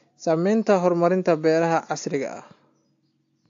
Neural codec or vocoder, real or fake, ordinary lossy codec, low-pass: none; real; AAC, 48 kbps; 7.2 kHz